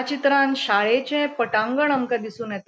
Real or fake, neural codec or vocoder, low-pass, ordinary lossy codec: real; none; none; none